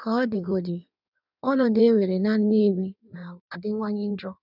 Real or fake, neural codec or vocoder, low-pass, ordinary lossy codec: fake; codec, 16 kHz in and 24 kHz out, 1.1 kbps, FireRedTTS-2 codec; 5.4 kHz; none